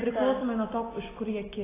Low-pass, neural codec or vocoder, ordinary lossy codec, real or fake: 3.6 kHz; none; AAC, 16 kbps; real